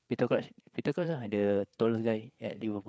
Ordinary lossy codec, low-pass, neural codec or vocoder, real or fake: none; none; codec, 16 kHz, 4 kbps, FreqCodec, larger model; fake